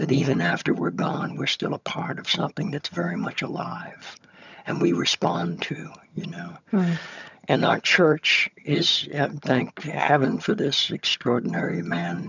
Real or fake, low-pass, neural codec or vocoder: fake; 7.2 kHz; vocoder, 22.05 kHz, 80 mel bands, HiFi-GAN